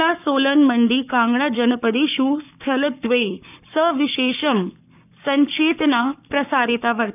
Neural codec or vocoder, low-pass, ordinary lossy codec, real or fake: codec, 44.1 kHz, 7.8 kbps, Pupu-Codec; 3.6 kHz; none; fake